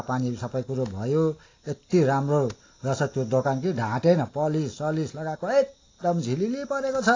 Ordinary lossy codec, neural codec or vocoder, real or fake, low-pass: AAC, 32 kbps; none; real; 7.2 kHz